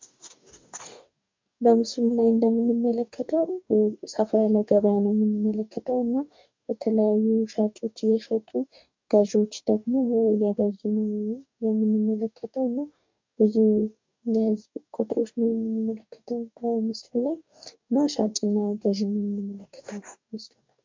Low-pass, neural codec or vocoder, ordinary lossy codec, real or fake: 7.2 kHz; codec, 44.1 kHz, 2.6 kbps, DAC; MP3, 64 kbps; fake